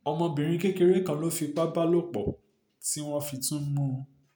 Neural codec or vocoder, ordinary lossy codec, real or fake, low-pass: none; none; real; none